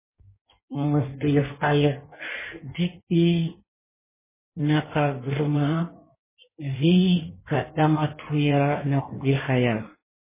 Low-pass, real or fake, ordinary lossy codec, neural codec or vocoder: 3.6 kHz; fake; MP3, 16 kbps; codec, 16 kHz in and 24 kHz out, 1.1 kbps, FireRedTTS-2 codec